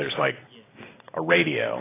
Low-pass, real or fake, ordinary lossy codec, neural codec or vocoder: 3.6 kHz; real; AAC, 16 kbps; none